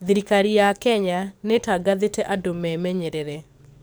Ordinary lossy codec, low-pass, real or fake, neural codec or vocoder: none; none; fake; codec, 44.1 kHz, 7.8 kbps, DAC